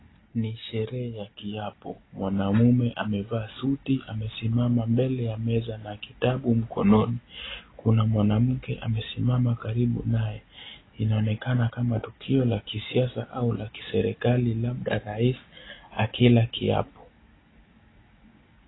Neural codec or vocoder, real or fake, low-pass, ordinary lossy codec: none; real; 7.2 kHz; AAC, 16 kbps